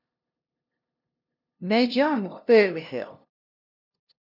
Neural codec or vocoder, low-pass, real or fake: codec, 16 kHz, 0.5 kbps, FunCodec, trained on LibriTTS, 25 frames a second; 5.4 kHz; fake